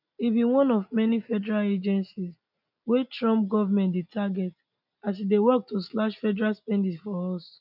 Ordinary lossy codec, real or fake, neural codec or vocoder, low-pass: none; real; none; 5.4 kHz